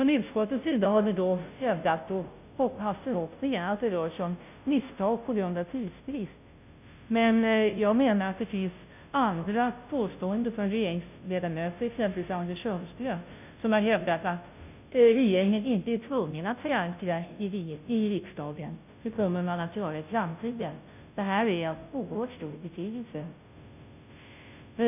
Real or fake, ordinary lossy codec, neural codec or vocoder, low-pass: fake; none; codec, 16 kHz, 0.5 kbps, FunCodec, trained on Chinese and English, 25 frames a second; 3.6 kHz